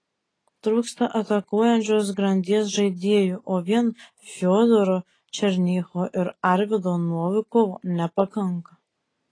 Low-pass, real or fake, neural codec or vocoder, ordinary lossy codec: 9.9 kHz; real; none; AAC, 32 kbps